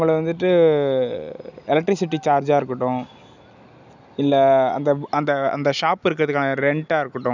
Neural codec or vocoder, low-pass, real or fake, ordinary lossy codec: none; 7.2 kHz; real; none